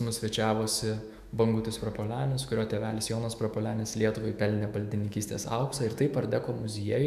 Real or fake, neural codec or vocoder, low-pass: fake; autoencoder, 48 kHz, 128 numbers a frame, DAC-VAE, trained on Japanese speech; 14.4 kHz